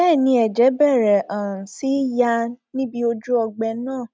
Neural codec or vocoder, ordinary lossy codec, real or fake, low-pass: none; none; real; none